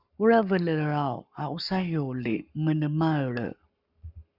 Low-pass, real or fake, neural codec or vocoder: 5.4 kHz; fake; codec, 44.1 kHz, 7.8 kbps, Pupu-Codec